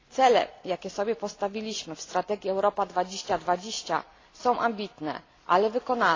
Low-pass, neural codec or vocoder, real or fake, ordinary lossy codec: 7.2 kHz; none; real; AAC, 32 kbps